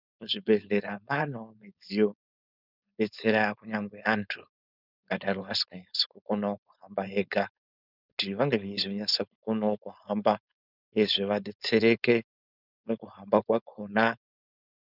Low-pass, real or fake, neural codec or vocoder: 5.4 kHz; fake; codec, 16 kHz, 4.8 kbps, FACodec